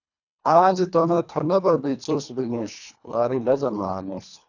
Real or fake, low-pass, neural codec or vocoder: fake; 7.2 kHz; codec, 24 kHz, 1.5 kbps, HILCodec